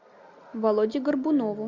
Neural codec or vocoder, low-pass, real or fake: none; 7.2 kHz; real